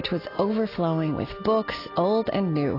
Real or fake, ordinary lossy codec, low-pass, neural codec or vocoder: real; AAC, 24 kbps; 5.4 kHz; none